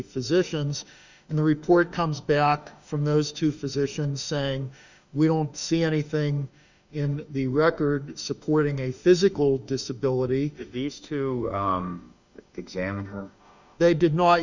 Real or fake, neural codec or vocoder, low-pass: fake; autoencoder, 48 kHz, 32 numbers a frame, DAC-VAE, trained on Japanese speech; 7.2 kHz